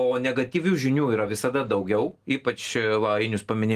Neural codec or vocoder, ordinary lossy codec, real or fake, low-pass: none; Opus, 24 kbps; real; 14.4 kHz